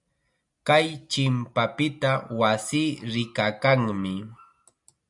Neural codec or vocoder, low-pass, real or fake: none; 10.8 kHz; real